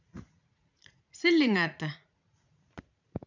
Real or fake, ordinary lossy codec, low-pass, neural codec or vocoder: fake; none; 7.2 kHz; vocoder, 44.1 kHz, 80 mel bands, Vocos